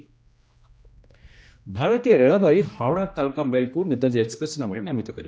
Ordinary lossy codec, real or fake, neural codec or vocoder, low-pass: none; fake; codec, 16 kHz, 1 kbps, X-Codec, HuBERT features, trained on general audio; none